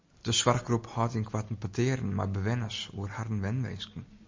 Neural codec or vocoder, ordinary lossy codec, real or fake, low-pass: none; MP3, 64 kbps; real; 7.2 kHz